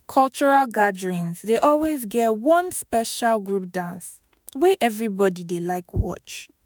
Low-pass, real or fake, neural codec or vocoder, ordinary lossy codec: none; fake; autoencoder, 48 kHz, 32 numbers a frame, DAC-VAE, trained on Japanese speech; none